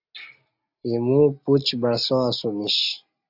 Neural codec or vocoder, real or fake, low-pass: none; real; 5.4 kHz